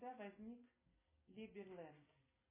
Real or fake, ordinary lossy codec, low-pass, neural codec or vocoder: real; MP3, 16 kbps; 3.6 kHz; none